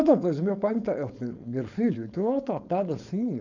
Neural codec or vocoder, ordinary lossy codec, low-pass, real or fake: codec, 16 kHz, 4.8 kbps, FACodec; none; 7.2 kHz; fake